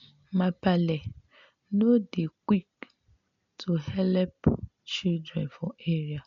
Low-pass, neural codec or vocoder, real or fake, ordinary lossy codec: 7.2 kHz; none; real; MP3, 64 kbps